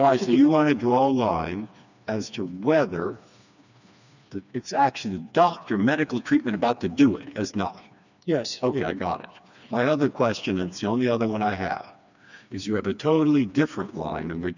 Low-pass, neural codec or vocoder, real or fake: 7.2 kHz; codec, 16 kHz, 2 kbps, FreqCodec, smaller model; fake